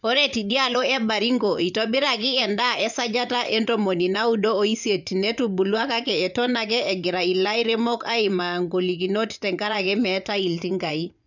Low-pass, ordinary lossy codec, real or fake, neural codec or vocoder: 7.2 kHz; none; fake; vocoder, 24 kHz, 100 mel bands, Vocos